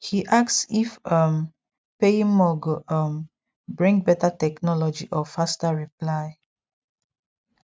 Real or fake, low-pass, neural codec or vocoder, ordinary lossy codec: real; none; none; none